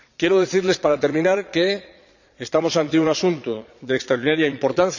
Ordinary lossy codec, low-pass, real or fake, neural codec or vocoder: none; 7.2 kHz; fake; vocoder, 22.05 kHz, 80 mel bands, Vocos